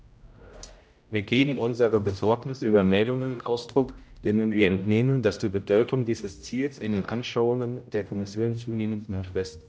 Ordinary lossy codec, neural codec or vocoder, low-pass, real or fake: none; codec, 16 kHz, 0.5 kbps, X-Codec, HuBERT features, trained on general audio; none; fake